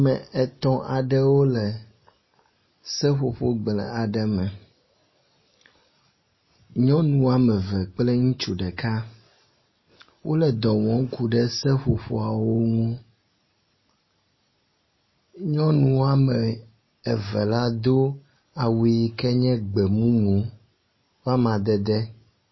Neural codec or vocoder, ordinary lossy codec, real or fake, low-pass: none; MP3, 24 kbps; real; 7.2 kHz